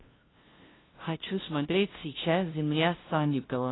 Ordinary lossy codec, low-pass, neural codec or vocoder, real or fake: AAC, 16 kbps; 7.2 kHz; codec, 16 kHz, 0.5 kbps, FunCodec, trained on LibriTTS, 25 frames a second; fake